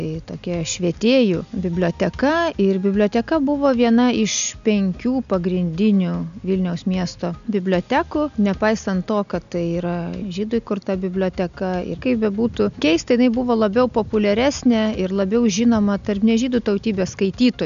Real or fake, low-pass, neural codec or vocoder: real; 7.2 kHz; none